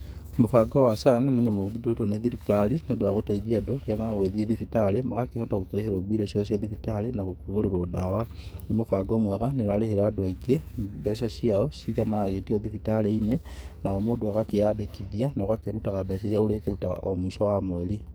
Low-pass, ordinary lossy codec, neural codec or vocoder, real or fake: none; none; codec, 44.1 kHz, 2.6 kbps, SNAC; fake